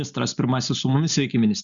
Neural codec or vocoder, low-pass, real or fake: none; 7.2 kHz; real